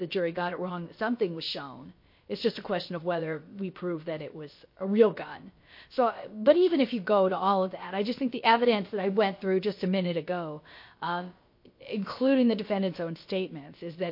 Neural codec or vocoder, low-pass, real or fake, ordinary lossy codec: codec, 16 kHz, about 1 kbps, DyCAST, with the encoder's durations; 5.4 kHz; fake; MP3, 32 kbps